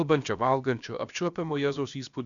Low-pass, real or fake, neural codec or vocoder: 7.2 kHz; fake; codec, 16 kHz, about 1 kbps, DyCAST, with the encoder's durations